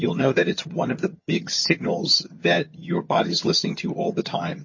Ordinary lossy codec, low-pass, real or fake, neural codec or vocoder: MP3, 32 kbps; 7.2 kHz; fake; vocoder, 22.05 kHz, 80 mel bands, HiFi-GAN